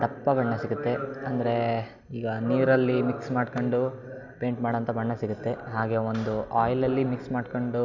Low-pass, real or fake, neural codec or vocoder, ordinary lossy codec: 7.2 kHz; real; none; none